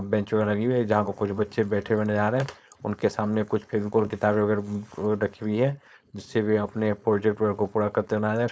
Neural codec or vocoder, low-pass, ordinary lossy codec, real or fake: codec, 16 kHz, 4.8 kbps, FACodec; none; none; fake